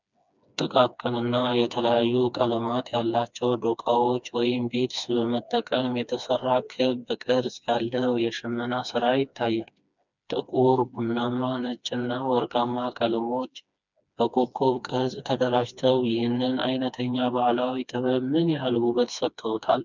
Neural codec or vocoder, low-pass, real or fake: codec, 16 kHz, 2 kbps, FreqCodec, smaller model; 7.2 kHz; fake